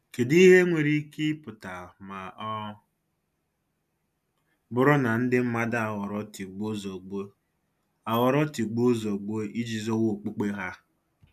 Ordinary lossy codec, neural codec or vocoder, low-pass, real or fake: none; none; 14.4 kHz; real